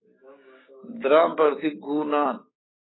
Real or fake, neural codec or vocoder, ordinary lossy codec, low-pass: fake; codec, 16 kHz, 6 kbps, DAC; AAC, 16 kbps; 7.2 kHz